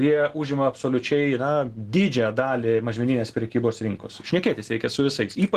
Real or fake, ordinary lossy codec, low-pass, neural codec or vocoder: real; Opus, 16 kbps; 14.4 kHz; none